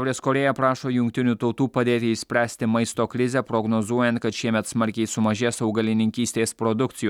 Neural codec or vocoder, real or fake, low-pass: none; real; 19.8 kHz